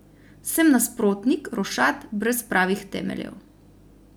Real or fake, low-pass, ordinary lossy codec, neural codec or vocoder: real; none; none; none